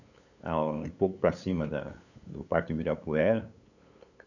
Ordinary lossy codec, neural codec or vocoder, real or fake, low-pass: none; codec, 16 kHz, 8 kbps, FunCodec, trained on LibriTTS, 25 frames a second; fake; 7.2 kHz